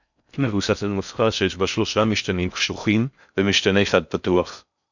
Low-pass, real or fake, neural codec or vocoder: 7.2 kHz; fake; codec, 16 kHz in and 24 kHz out, 0.6 kbps, FocalCodec, streaming, 4096 codes